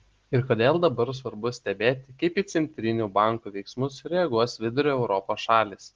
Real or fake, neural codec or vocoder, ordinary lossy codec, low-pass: real; none; Opus, 16 kbps; 7.2 kHz